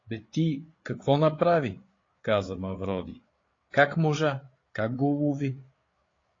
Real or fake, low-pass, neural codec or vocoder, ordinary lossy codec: fake; 7.2 kHz; codec, 16 kHz, 8 kbps, FreqCodec, larger model; AAC, 32 kbps